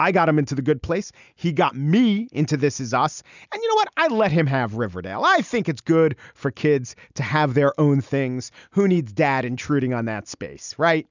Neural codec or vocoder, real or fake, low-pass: none; real; 7.2 kHz